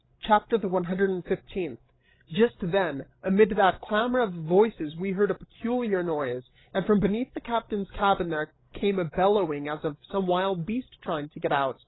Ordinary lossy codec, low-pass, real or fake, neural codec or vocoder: AAC, 16 kbps; 7.2 kHz; fake; codec, 16 kHz, 16 kbps, FreqCodec, larger model